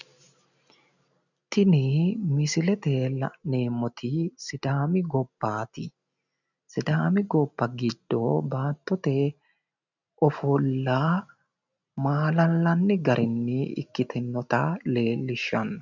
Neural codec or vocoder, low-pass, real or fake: none; 7.2 kHz; real